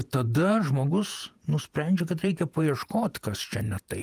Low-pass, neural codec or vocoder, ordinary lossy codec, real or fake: 14.4 kHz; vocoder, 48 kHz, 128 mel bands, Vocos; Opus, 32 kbps; fake